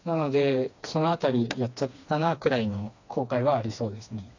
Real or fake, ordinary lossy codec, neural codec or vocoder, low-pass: fake; AAC, 48 kbps; codec, 16 kHz, 2 kbps, FreqCodec, smaller model; 7.2 kHz